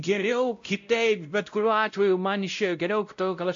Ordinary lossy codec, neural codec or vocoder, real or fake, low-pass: AAC, 64 kbps; codec, 16 kHz, 0.5 kbps, X-Codec, WavLM features, trained on Multilingual LibriSpeech; fake; 7.2 kHz